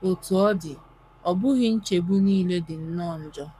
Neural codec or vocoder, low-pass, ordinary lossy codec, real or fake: codec, 44.1 kHz, 7.8 kbps, Pupu-Codec; 14.4 kHz; none; fake